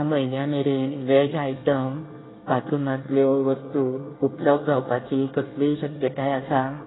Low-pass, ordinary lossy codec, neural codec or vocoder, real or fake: 7.2 kHz; AAC, 16 kbps; codec, 24 kHz, 1 kbps, SNAC; fake